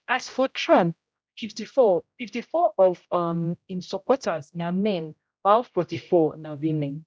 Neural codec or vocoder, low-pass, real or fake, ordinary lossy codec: codec, 16 kHz, 0.5 kbps, X-Codec, HuBERT features, trained on general audio; none; fake; none